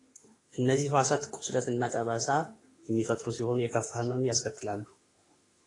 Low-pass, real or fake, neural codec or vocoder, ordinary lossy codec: 10.8 kHz; fake; autoencoder, 48 kHz, 32 numbers a frame, DAC-VAE, trained on Japanese speech; AAC, 32 kbps